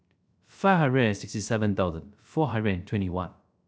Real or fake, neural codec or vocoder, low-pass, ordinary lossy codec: fake; codec, 16 kHz, 0.3 kbps, FocalCodec; none; none